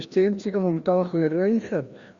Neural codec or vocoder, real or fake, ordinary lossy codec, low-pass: codec, 16 kHz, 1 kbps, FreqCodec, larger model; fake; Opus, 64 kbps; 7.2 kHz